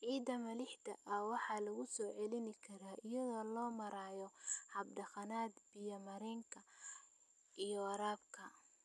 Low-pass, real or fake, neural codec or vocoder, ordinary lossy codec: none; real; none; none